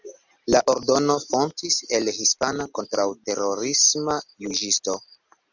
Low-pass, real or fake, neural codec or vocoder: 7.2 kHz; real; none